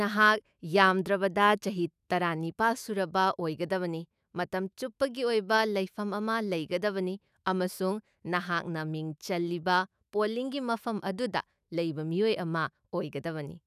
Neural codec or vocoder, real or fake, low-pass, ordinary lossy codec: autoencoder, 48 kHz, 128 numbers a frame, DAC-VAE, trained on Japanese speech; fake; 14.4 kHz; none